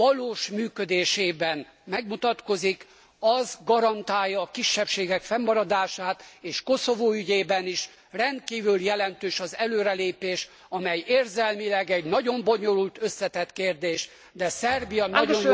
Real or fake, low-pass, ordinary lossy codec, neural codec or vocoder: real; none; none; none